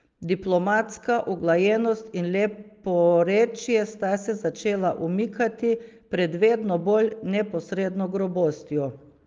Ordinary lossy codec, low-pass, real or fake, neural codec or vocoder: Opus, 32 kbps; 7.2 kHz; real; none